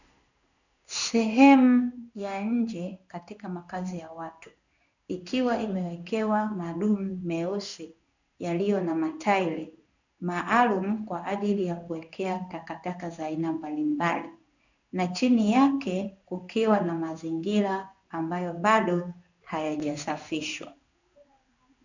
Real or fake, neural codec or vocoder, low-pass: fake; codec, 16 kHz in and 24 kHz out, 1 kbps, XY-Tokenizer; 7.2 kHz